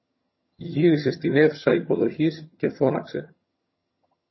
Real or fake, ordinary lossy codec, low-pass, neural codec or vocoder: fake; MP3, 24 kbps; 7.2 kHz; vocoder, 22.05 kHz, 80 mel bands, HiFi-GAN